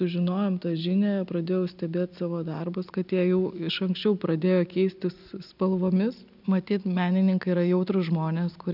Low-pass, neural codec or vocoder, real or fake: 5.4 kHz; none; real